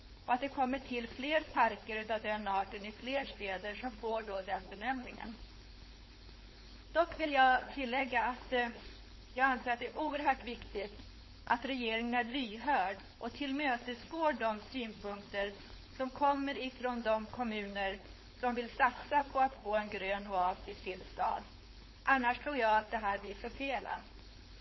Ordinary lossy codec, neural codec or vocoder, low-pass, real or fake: MP3, 24 kbps; codec, 16 kHz, 4.8 kbps, FACodec; 7.2 kHz; fake